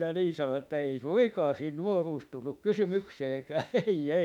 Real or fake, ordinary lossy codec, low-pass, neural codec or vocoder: fake; none; 19.8 kHz; autoencoder, 48 kHz, 32 numbers a frame, DAC-VAE, trained on Japanese speech